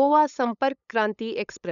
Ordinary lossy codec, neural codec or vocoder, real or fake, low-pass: none; codec, 16 kHz, 8 kbps, FunCodec, trained on LibriTTS, 25 frames a second; fake; 7.2 kHz